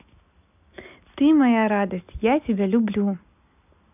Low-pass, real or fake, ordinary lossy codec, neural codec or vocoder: 3.6 kHz; real; none; none